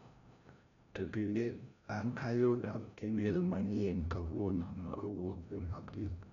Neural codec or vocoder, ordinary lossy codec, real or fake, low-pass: codec, 16 kHz, 0.5 kbps, FreqCodec, larger model; none; fake; 7.2 kHz